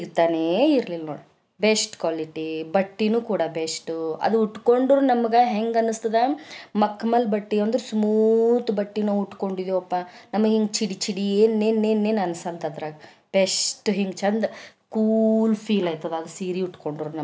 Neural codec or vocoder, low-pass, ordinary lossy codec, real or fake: none; none; none; real